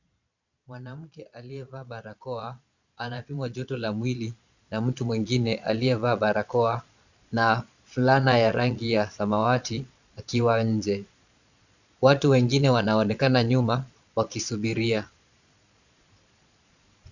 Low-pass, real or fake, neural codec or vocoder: 7.2 kHz; fake; vocoder, 22.05 kHz, 80 mel bands, Vocos